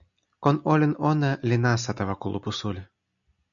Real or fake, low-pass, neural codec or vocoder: real; 7.2 kHz; none